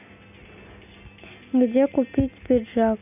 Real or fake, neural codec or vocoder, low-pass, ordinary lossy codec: real; none; 3.6 kHz; none